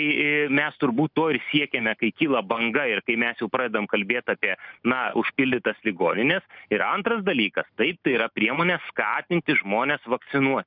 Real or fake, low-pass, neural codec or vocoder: real; 5.4 kHz; none